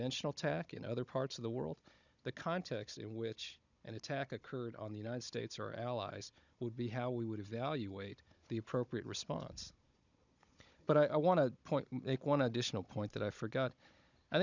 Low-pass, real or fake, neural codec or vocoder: 7.2 kHz; fake; codec, 16 kHz, 16 kbps, FunCodec, trained on Chinese and English, 50 frames a second